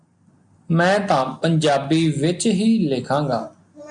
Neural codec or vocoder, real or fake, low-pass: none; real; 9.9 kHz